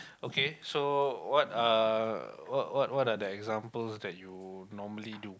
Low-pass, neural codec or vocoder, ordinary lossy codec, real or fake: none; none; none; real